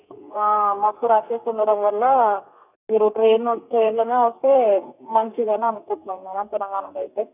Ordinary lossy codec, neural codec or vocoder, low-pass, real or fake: none; codec, 32 kHz, 1.9 kbps, SNAC; 3.6 kHz; fake